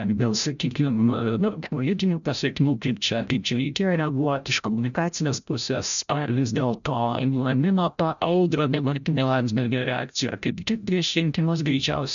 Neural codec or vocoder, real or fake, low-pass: codec, 16 kHz, 0.5 kbps, FreqCodec, larger model; fake; 7.2 kHz